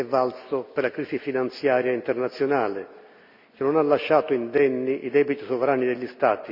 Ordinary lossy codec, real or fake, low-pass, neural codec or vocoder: none; real; 5.4 kHz; none